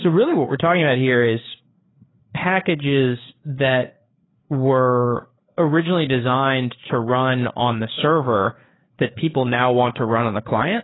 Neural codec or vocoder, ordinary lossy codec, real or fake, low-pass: codec, 16 kHz, 4 kbps, FreqCodec, larger model; AAC, 16 kbps; fake; 7.2 kHz